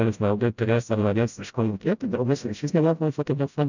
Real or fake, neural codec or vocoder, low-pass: fake; codec, 16 kHz, 0.5 kbps, FreqCodec, smaller model; 7.2 kHz